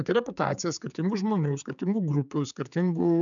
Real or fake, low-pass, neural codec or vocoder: fake; 7.2 kHz; codec, 16 kHz, 4 kbps, X-Codec, HuBERT features, trained on general audio